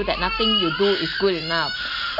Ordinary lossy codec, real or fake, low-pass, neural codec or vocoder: none; real; 5.4 kHz; none